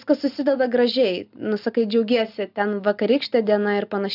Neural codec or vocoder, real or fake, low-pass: none; real; 5.4 kHz